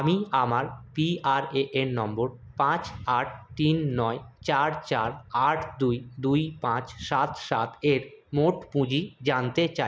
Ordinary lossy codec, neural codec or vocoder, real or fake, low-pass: none; none; real; none